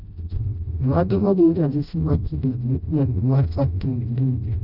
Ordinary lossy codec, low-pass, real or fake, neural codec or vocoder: none; 5.4 kHz; fake; codec, 16 kHz, 0.5 kbps, FreqCodec, smaller model